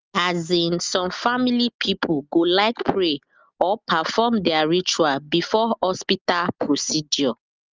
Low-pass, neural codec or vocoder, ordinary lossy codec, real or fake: 7.2 kHz; none; Opus, 32 kbps; real